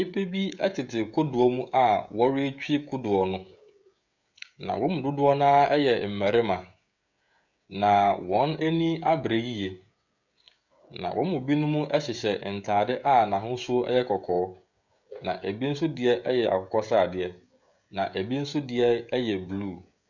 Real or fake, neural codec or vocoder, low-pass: fake; codec, 16 kHz, 16 kbps, FreqCodec, smaller model; 7.2 kHz